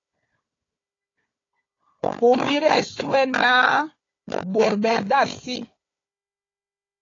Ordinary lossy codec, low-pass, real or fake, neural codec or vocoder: AAC, 32 kbps; 7.2 kHz; fake; codec, 16 kHz, 4 kbps, FunCodec, trained on Chinese and English, 50 frames a second